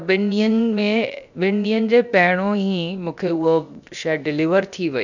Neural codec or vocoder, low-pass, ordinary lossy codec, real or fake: codec, 16 kHz, 0.7 kbps, FocalCodec; 7.2 kHz; none; fake